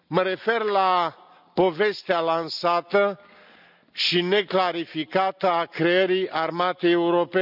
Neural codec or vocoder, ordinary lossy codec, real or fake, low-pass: none; none; real; 5.4 kHz